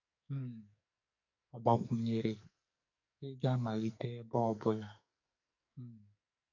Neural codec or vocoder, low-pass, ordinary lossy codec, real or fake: codec, 44.1 kHz, 2.6 kbps, SNAC; 7.2 kHz; none; fake